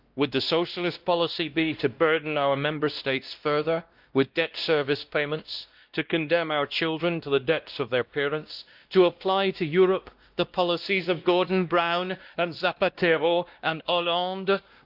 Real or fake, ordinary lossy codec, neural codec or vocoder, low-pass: fake; Opus, 32 kbps; codec, 16 kHz, 1 kbps, X-Codec, WavLM features, trained on Multilingual LibriSpeech; 5.4 kHz